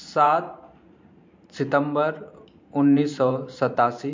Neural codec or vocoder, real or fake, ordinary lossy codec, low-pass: vocoder, 44.1 kHz, 128 mel bands every 512 samples, BigVGAN v2; fake; MP3, 48 kbps; 7.2 kHz